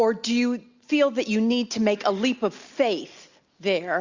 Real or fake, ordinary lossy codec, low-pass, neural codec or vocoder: real; Opus, 64 kbps; 7.2 kHz; none